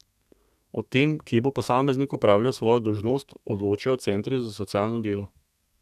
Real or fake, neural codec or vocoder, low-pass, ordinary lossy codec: fake; codec, 32 kHz, 1.9 kbps, SNAC; 14.4 kHz; none